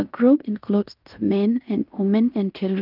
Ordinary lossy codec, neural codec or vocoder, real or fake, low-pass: Opus, 24 kbps; codec, 16 kHz in and 24 kHz out, 0.9 kbps, LongCat-Audio-Codec, fine tuned four codebook decoder; fake; 5.4 kHz